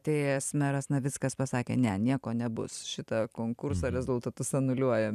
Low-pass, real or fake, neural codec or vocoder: 14.4 kHz; fake; vocoder, 44.1 kHz, 128 mel bands every 256 samples, BigVGAN v2